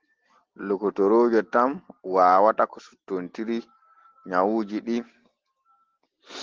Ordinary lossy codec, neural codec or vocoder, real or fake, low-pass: Opus, 16 kbps; none; real; 7.2 kHz